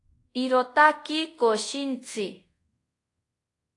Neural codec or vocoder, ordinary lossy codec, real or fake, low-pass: codec, 24 kHz, 0.5 kbps, DualCodec; AAC, 48 kbps; fake; 10.8 kHz